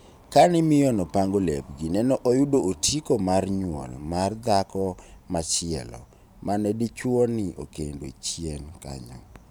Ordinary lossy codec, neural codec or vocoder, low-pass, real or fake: none; vocoder, 44.1 kHz, 128 mel bands every 512 samples, BigVGAN v2; none; fake